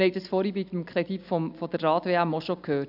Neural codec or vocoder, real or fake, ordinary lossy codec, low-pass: none; real; AAC, 48 kbps; 5.4 kHz